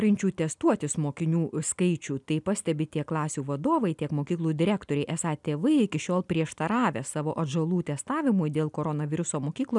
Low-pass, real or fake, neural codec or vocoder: 10.8 kHz; real; none